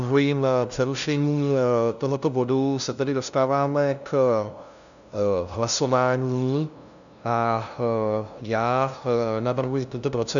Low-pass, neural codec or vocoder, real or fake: 7.2 kHz; codec, 16 kHz, 0.5 kbps, FunCodec, trained on LibriTTS, 25 frames a second; fake